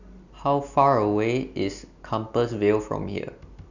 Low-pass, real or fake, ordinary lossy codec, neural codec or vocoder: 7.2 kHz; real; none; none